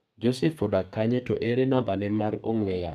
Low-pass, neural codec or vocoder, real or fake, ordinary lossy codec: 14.4 kHz; codec, 32 kHz, 1.9 kbps, SNAC; fake; none